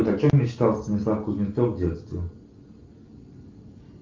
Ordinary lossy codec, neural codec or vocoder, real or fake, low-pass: Opus, 32 kbps; none; real; 7.2 kHz